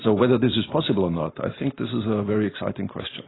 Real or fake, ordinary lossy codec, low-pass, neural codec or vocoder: real; AAC, 16 kbps; 7.2 kHz; none